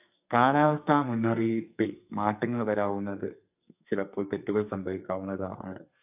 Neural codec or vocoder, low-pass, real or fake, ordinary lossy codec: codec, 44.1 kHz, 2.6 kbps, SNAC; 3.6 kHz; fake; AAC, 32 kbps